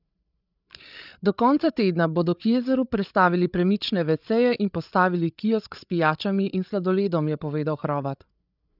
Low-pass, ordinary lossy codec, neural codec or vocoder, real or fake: 5.4 kHz; none; codec, 16 kHz, 8 kbps, FreqCodec, larger model; fake